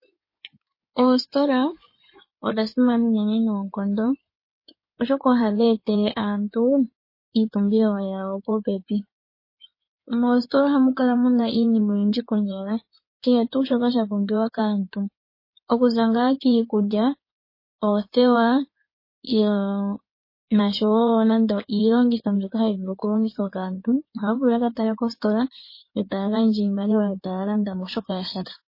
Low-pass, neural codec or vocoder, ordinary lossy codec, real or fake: 5.4 kHz; codec, 16 kHz in and 24 kHz out, 2.2 kbps, FireRedTTS-2 codec; MP3, 24 kbps; fake